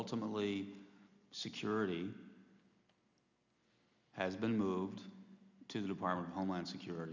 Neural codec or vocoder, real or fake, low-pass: none; real; 7.2 kHz